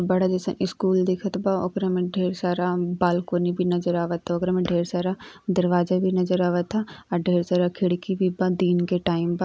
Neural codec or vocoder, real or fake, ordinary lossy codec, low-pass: none; real; none; none